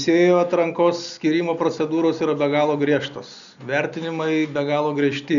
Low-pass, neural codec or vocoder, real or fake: 7.2 kHz; none; real